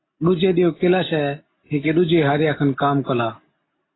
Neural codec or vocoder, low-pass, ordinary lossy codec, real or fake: none; 7.2 kHz; AAC, 16 kbps; real